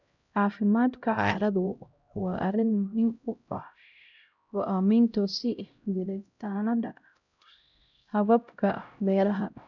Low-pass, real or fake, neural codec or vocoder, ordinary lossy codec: 7.2 kHz; fake; codec, 16 kHz, 0.5 kbps, X-Codec, HuBERT features, trained on LibriSpeech; none